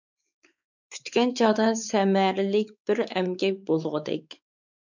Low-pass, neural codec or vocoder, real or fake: 7.2 kHz; codec, 16 kHz, 4 kbps, X-Codec, WavLM features, trained on Multilingual LibriSpeech; fake